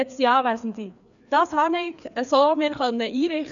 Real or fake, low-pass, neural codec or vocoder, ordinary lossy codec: fake; 7.2 kHz; codec, 16 kHz, 2 kbps, FreqCodec, larger model; none